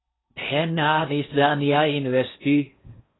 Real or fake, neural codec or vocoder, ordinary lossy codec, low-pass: fake; codec, 16 kHz in and 24 kHz out, 0.6 kbps, FocalCodec, streaming, 4096 codes; AAC, 16 kbps; 7.2 kHz